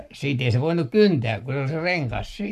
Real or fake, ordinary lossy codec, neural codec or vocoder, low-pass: fake; none; codec, 44.1 kHz, 7.8 kbps, Pupu-Codec; 14.4 kHz